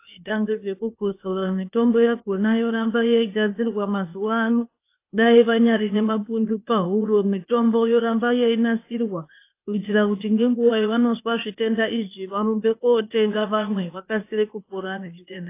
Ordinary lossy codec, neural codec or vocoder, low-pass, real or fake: AAC, 24 kbps; codec, 16 kHz, 0.8 kbps, ZipCodec; 3.6 kHz; fake